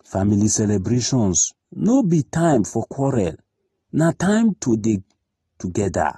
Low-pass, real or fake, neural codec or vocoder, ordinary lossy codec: 19.8 kHz; real; none; AAC, 32 kbps